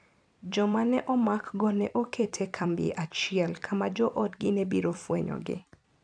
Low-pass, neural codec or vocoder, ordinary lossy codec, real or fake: 9.9 kHz; vocoder, 44.1 kHz, 128 mel bands every 256 samples, BigVGAN v2; none; fake